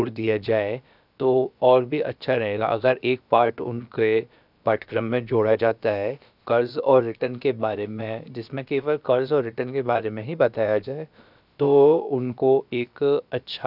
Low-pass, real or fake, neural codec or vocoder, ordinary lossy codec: 5.4 kHz; fake; codec, 16 kHz, about 1 kbps, DyCAST, with the encoder's durations; none